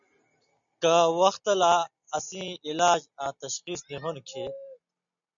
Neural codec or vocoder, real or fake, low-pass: none; real; 7.2 kHz